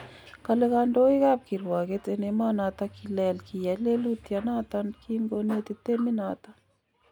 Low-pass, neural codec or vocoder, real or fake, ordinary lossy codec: 19.8 kHz; none; real; none